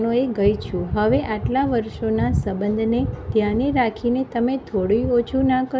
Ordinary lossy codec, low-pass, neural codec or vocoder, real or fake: none; none; none; real